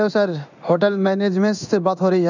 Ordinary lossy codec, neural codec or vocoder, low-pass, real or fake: none; codec, 16 kHz in and 24 kHz out, 1 kbps, XY-Tokenizer; 7.2 kHz; fake